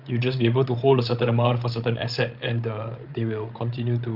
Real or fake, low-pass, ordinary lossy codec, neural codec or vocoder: fake; 5.4 kHz; Opus, 32 kbps; codec, 16 kHz, 16 kbps, FreqCodec, larger model